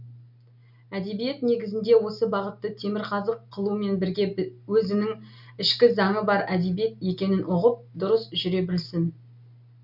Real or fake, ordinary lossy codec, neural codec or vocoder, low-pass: real; none; none; 5.4 kHz